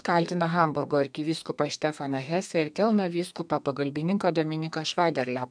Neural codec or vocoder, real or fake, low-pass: codec, 32 kHz, 1.9 kbps, SNAC; fake; 9.9 kHz